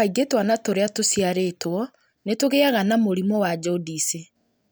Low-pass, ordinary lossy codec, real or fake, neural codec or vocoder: none; none; real; none